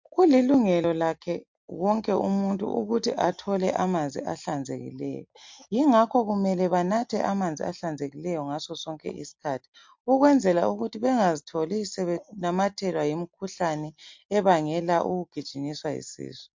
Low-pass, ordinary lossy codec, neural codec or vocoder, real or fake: 7.2 kHz; MP3, 48 kbps; none; real